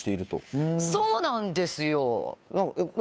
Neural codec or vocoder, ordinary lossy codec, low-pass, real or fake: codec, 16 kHz, 2 kbps, FunCodec, trained on Chinese and English, 25 frames a second; none; none; fake